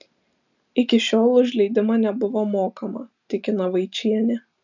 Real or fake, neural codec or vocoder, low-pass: real; none; 7.2 kHz